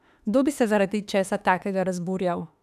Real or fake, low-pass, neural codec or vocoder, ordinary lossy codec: fake; 14.4 kHz; autoencoder, 48 kHz, 32 numbers a frame, DAC-VAE, trained on Japanese speech; none